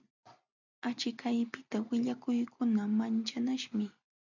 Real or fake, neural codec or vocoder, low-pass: real; none; 7.2 kHz